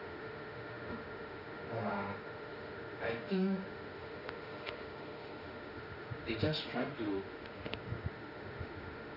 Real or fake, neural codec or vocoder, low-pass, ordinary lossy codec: fake; autoencoder, 48 kHz, 32 numbers a frame, DAC-VAE, trained on Japanese speech; 5.4 kHz; none